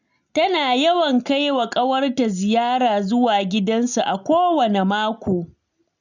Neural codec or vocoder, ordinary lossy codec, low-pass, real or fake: none; none; 7.2 kHz; real